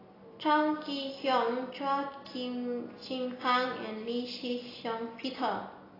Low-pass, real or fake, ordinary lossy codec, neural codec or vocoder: 5.4 kHz; real; AAC, 24 kbps; none